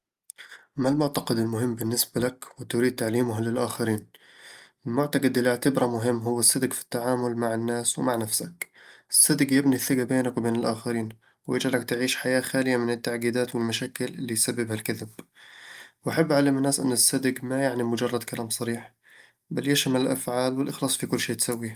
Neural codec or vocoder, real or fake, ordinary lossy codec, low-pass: none; real; Opus, 32 kbps; 14.4 kHz